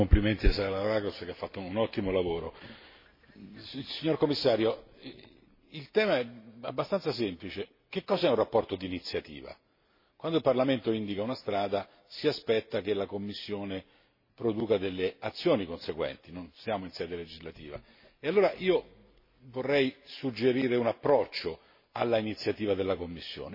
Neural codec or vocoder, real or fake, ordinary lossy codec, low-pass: none; real; MP3, 24 kbps; 5.4 kHz